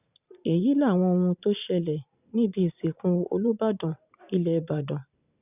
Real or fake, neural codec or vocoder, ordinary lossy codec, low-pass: fake; vocoder, 44.1 kHz, 128 mel bands every 512 samples, BigVGAN v2; none; 3.6 kHz